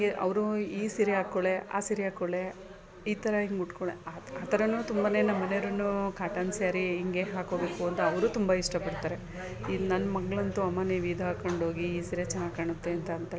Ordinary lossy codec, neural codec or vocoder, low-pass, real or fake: none; none; none; real